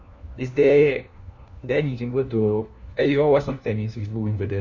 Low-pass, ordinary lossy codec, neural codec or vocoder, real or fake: 7.2 kHz; none; codec, 16 kHz, 1 kbps, FunCodec, trained on LibriTTS, 50 frames a second; fake